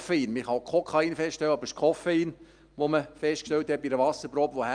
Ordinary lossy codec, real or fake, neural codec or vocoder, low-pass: Opus, 32 kbps; real; none; 9.9 kHz